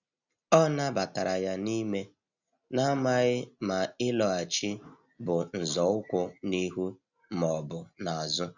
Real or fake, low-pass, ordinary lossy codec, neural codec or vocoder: real; 7.2 kHz; none; none